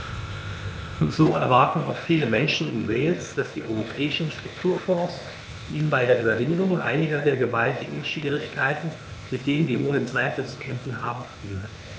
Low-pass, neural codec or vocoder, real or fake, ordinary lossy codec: none; codec, 16 kHz, 0.8 kbps, ZipCodec; fake; none